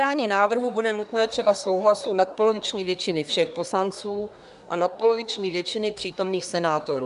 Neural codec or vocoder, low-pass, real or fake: codec, 24 kHz, 1 kbps, SNAC; 10.8 kHz; fake